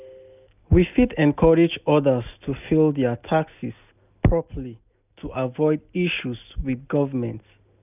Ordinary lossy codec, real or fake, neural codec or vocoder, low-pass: none; real; none; 3.6 kHz